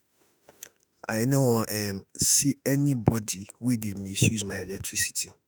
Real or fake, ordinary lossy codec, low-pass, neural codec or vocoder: fake; none; none; autoencoder, 48 kHz, 32 numbers a frame, DAC-VAE, trained on Japanese speech